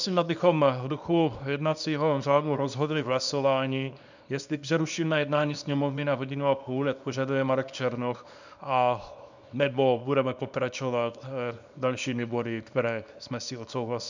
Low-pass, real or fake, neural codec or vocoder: 7.2 kHz; fake; codec, 24 kHz, 0.9 kbps, WavTokenizer, small release